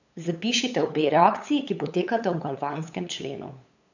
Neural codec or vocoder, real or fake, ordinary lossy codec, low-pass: codec, 16 kHz, 8 kbps, FunCodec, trained on LibriTTS, 25 frames a second; fake; none; 7.2 kHz